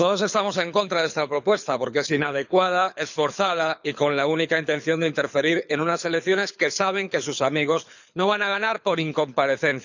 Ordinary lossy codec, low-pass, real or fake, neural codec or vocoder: none; 7.2 kHz; fake; codec, 24 kHz, 6 kbps, HILCodec